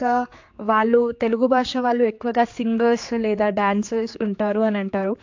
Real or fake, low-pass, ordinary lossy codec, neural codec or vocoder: fake; 7.2 kHz; AAC, 48 kbps; codec, 16 kHz, 4 kbps, X-Codec, HuBERT features, trained on general audio